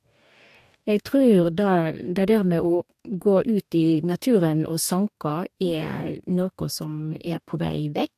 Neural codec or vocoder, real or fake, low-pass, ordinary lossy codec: codec, 44.1 kHz, 2.6 kbps, DAC; fake; 14.4 kHz; AAC, 96 kbps